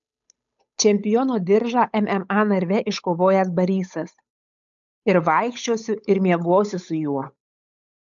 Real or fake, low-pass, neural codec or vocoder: fake; 7.2 kHz; codec, 16 kHz, 8 kbps, FunCodec, trained on Chinese and English, 25 frames a second